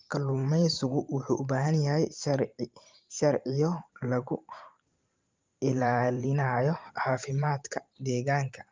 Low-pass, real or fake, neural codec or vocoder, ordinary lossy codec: 7.2 kHz; fake; vocoder, 44.1 kHz, 128 mel bands every 512 samples, BigVGAN v2; Opus, 24 kbps